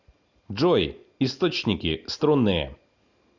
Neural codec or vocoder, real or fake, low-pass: none; real; 7.2 kHz